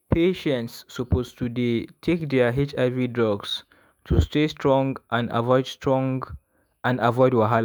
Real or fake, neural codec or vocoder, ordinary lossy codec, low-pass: fake; autoencoder, 48 kHz, 128 numbers a frame, DAC-VAE, trained on Japanese speech; none; none